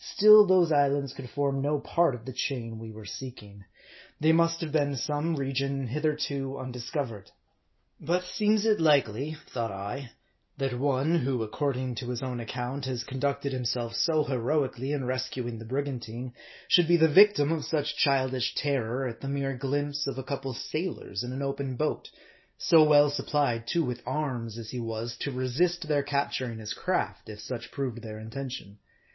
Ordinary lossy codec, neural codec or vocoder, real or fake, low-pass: MP3, 24 kbps; none; real; 7.2 kHz